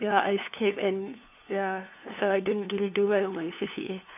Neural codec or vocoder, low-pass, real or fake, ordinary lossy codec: codec, 16 kHz, 2 kbps, FunCodec, trained on LibriTTS, 25 frames a second; 3.6 kHz; fake; none